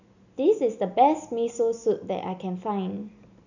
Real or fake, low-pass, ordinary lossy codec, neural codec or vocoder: real; 7.2 kHz; none; none